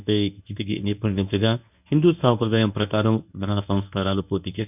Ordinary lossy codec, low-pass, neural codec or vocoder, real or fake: none; 3.6 kHz; codec, 24 kHz, 0.9 kbps, WavTokenizer, medium speech release version 1; fake